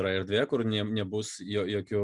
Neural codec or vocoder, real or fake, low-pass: none; real; 10.8 kHz